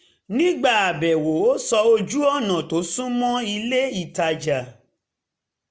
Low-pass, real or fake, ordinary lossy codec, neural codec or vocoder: none; real; none; none